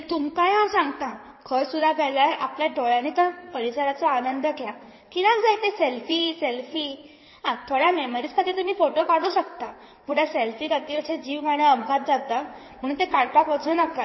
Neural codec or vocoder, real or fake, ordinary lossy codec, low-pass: codec, 16 kHz in and 24 kHz out, 2.2 kbps, FireRedTTS-2 codec; fake; MP3, 24 kbps; 7.2 kHz